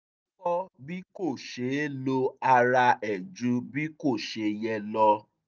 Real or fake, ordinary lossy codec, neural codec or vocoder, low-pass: real; none; none; none